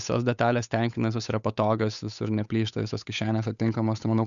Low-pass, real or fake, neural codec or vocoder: 7.2 kHz; fake; codec, 16 kHz, 8 kbps, FunCodec, trained on Chinese and English, 25 frames a second